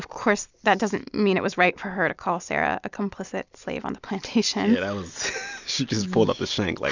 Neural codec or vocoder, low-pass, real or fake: none; 7.2 kHz; real